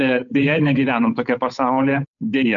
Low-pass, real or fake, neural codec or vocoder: 7.2 kHz; fake; codec, 16 kHz, 8 kbps, FunCodec, trained on Chinese and English, 25 frames a second